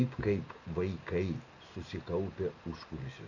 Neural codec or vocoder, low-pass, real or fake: none; 7.2 kHz; real